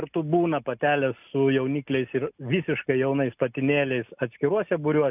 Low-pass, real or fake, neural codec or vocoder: 3.6 kHz; real; none